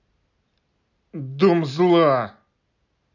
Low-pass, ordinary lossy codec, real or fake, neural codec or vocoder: 7.2 kHz; none; real; none